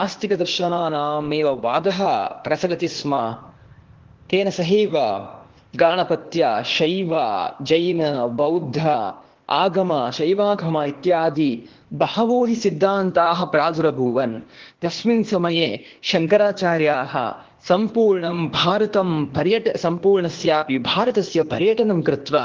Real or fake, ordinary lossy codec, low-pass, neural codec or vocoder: fake; Opus, 16 kbps; 7.2 kHz; codec, 16 kHz, 0.8 kbps, ZipCodec